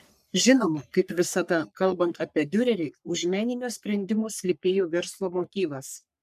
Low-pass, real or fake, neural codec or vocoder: 14.4 kHz; fake; codec, 44.1 kHz, 3.4 kbps, Pupu-Codec